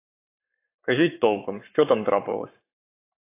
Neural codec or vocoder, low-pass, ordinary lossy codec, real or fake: codec, 44.1 kHz, 7.8 kbps, Pupu-Codec; 3.6 kHz; AAC, 24 kbps; fake